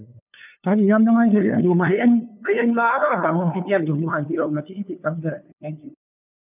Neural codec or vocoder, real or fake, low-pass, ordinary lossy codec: codec, 16 kHz, 2 kbps, FunCodec, trained on LibriTTS, 25 frames a second; fake; 3.6 kHz; none